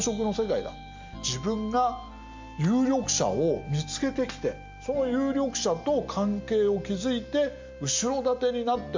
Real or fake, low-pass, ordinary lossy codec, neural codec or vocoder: real; 7.2 kHz; MP3, 64 kbps; none